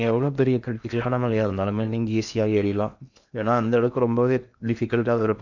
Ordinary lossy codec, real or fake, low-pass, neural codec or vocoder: none; fake; 7.2 kHz; codec, 16 kHz in and 24 kHz out, 0.8 kbps, FocalCodec, streaming, 65536 codes